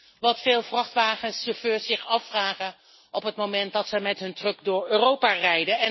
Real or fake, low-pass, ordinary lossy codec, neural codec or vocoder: real; 7.2 kHz; MP3, 24 kbps; none